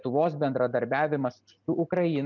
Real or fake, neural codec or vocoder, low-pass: real; none; 7.2 kHz